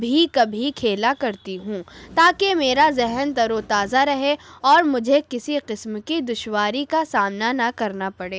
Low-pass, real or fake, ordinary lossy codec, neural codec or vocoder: none; real; none; none